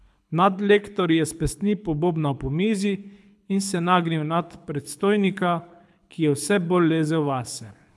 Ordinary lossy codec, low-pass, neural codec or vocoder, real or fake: none; none; codec, 24 kHz, 6 kbps, HILCodec; fake